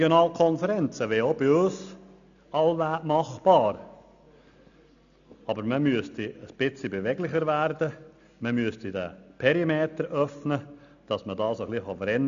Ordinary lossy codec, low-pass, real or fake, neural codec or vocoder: AAC, 96 kbps; 7.2 kHz; real; none